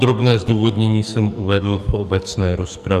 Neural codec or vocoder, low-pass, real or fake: codec, 44.1 kHz, 2.6 kbps, SNAC; 14.4 kHz; fake